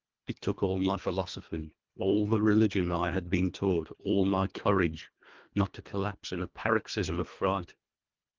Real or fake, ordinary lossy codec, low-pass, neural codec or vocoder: fake; Opus, 32 kbps; 7.2 kHz; codec, 24 kHz, 1.5 kbps, HILCodec